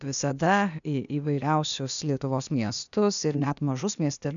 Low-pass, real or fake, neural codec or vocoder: 7.2 kHz; fake; codec, 16 kHz, 0.8 kbps, ZipCodec